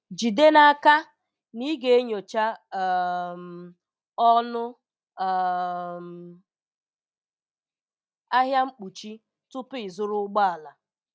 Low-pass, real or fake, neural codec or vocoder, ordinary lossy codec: none; real; none; none